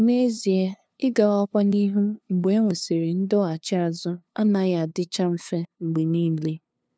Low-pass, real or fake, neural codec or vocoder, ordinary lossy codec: none; fake; codec, 16 kHz, 2 kbps, FunCodec, trained on LibriTTS, 25 frames a second; none